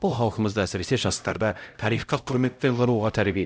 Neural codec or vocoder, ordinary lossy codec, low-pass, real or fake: codec, 16 kHz, 0.5 kbps, X-Codec, HuBERT features, trained on LibriSpeech; none; none; fake